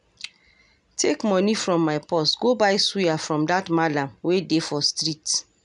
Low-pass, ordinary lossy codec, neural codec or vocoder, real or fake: 10.8 kHz; none; none; real